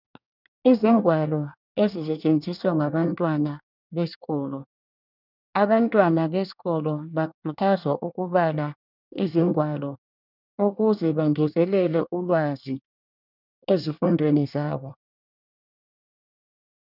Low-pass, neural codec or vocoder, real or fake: 5.4 kHz; codec, 24 kHz, 1 kbps, SNAC; fake